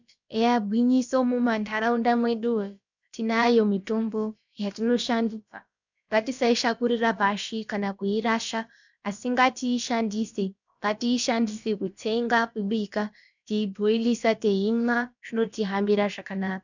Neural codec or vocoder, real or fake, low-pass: codec, 16 kHz, about 1 kbps, DyCAST, with the encoder's durations; fake; 7.2 kHz